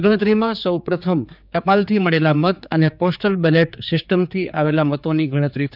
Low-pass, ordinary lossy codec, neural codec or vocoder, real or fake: 5.4 kHz; none; codec, 16 kHz, 4 kbps, X-Codec, HuBERT features, trained on general audio; fake